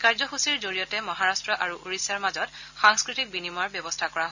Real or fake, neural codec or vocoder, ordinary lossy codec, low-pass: real; none; none; 7.2 kHz